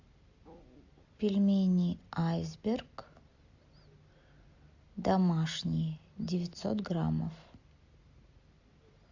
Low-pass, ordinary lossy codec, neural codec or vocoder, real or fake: 7.2 kHz; AAC, 48 kbps; none; real